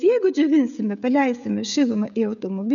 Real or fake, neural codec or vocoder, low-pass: fake; codec, 16 kHz, 16 kbps, FreqCodec, smaller model; 7.2 kHz